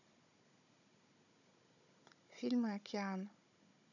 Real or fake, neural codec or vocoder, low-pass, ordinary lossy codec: fake; codec, 16 kHz, 16 kbps, FunCodec, trained on Chinese and English, 50 frames a second; 7.2 kHz; none